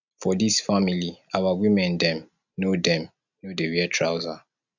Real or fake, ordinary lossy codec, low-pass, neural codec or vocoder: real; none; 7.2 kHz; none